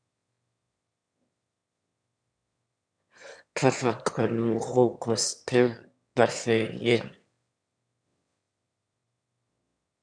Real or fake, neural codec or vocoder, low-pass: fake; autoencoder, 22.05 kHz, a latent of 192 numbers a frame, VITS, trained on one speaker; 9.9 kHz